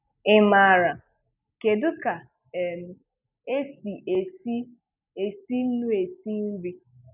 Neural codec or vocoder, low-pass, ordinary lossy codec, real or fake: none; 3.6 kHz; none; real